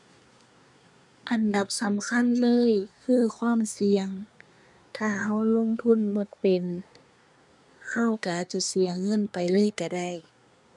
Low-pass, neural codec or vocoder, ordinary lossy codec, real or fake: 10.8 kHz; codec, 24 kHz, 1 kbps, SNAC; none; fake